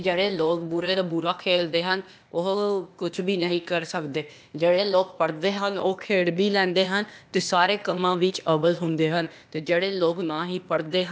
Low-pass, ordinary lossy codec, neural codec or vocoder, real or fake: none; none; codec, 16 kHz, 0.8 kbps, ZipCodec; fake